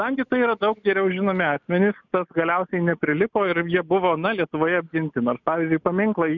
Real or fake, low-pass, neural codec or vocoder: real; 7.2 kHz; none